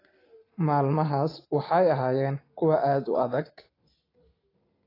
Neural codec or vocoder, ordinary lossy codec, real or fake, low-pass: none; AAC, 24 kbps; real; 5.4 kHz